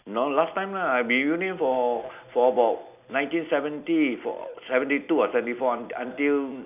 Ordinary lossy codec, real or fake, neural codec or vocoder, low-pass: none; real; none; 3.6 kHz